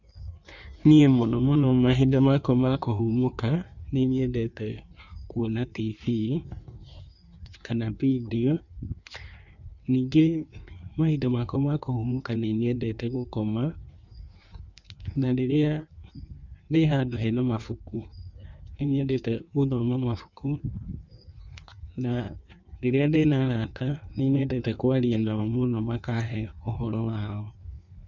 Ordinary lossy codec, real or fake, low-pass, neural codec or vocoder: none; fake; 7.2 kHz; codec, 16 kHz in and 24 kHz out, 1.1 kbps, FireRedTTS-2 codec